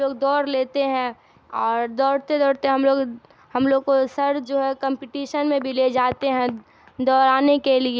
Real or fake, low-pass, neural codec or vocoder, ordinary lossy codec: real; none; none; none